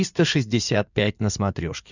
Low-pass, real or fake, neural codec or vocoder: 7.2 kHz; fake; codec, 16 kHz in and 24 kHz out, 2.2 kbps, FireRedTTS-2 codec